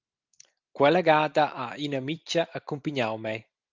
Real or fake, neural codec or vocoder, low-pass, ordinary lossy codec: real; none; 7.2 kHz; Opus, 24 kbps